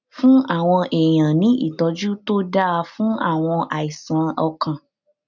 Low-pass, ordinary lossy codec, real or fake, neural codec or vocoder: 7.2 kHz; none; real; none